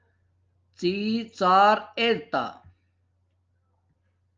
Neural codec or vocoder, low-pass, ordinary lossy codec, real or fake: none; 7.2 kHz; Opus, 24 kbps; real